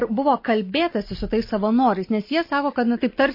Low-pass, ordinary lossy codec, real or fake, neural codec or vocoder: 5.4 kHz; MP3, 24 kbps; real; none